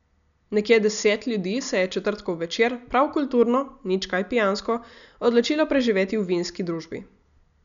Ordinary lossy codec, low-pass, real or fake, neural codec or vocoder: none; 7.2 kHz; real; none